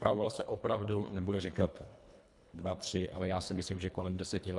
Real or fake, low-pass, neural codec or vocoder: fake; 10.8 kHz; codec, 24 kHz, 1.5 kbps, HILCodec